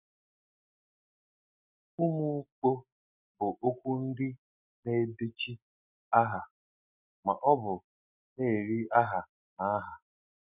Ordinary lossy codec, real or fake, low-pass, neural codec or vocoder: none; real; 3.6 kHz; none